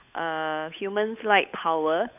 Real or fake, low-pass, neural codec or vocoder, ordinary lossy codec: fake; 3.6 kHz; codec, 16 kHz, 8 kbps, FunCodec, trained on Chinese and English, 25 frames a second; none